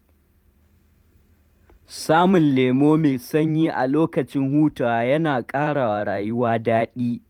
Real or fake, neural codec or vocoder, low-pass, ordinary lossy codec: fake; vocoder, 44.1 kHz, 128 mel bands every 512 samples, BigVGAN v2; 19.8 kHz; none